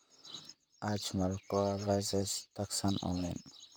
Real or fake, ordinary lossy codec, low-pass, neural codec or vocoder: fake; none; none; vocoder, 44.1 kHz, 128 mel bands, Pupu-Vocoder